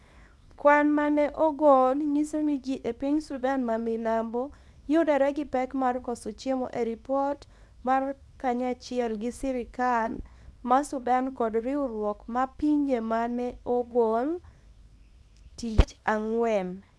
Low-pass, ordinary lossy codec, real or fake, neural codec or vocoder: none; none; fake; codec, 24 kHz, 0.9 kbps, WavTokenizer, small release